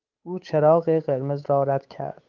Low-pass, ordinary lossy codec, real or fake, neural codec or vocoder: 7.2 kHz; Opus, 32 kbps; fake; codec, 16 kHz, 8 kbps, FunCodec, trained on Chinese and English, 25 frames a second